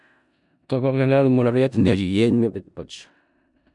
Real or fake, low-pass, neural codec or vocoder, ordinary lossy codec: fake; 10.8 kHz; codec, 16 kHz in and 24 kHz out, 0.4 kbps, LongCat-Audio-Codec, four codebook decoder; none